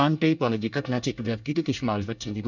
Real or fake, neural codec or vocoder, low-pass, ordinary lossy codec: fake; codec, 24 kHz, 1 kbps, SNAC; 7.2 kHz; none